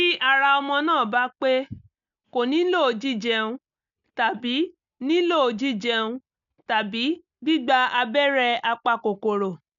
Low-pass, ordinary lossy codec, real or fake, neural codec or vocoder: 7.2 kHz; none; real; none